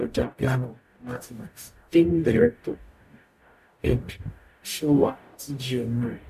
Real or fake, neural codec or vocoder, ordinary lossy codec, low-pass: fake; codec, 44.1 kHz, 0.9 kbps, DAC; none; 14.4 kHz